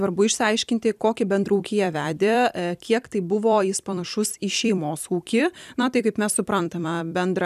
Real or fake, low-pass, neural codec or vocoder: fake; 14.4 kHz; vocoder, 44.1 kHz, 128 mel bands every 256 samples, BigVGAN v2